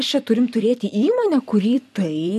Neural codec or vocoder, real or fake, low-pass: vocoder, 44.1 kHz, 128 mel bands every 256 samples, BigVGAN v2; fake; 14.4 kHz